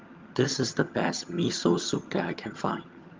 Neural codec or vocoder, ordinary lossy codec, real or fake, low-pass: vocoder, 22.05 kHz, 80 mel bands, HiFi-GAN; Opus, 32 kbps; fake; 7.2 kHz